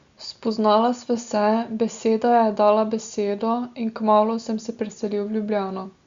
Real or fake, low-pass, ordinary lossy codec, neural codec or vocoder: real; 7.2 kHz; Opus, 64 kbps; none